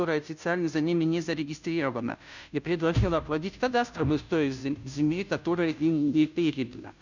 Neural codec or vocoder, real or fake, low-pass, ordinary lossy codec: codec, 16 kHz, 0.5 kbps, FunCodec, trained on Chinese and English, 25 frames a second; fake; 7.2 kHz; none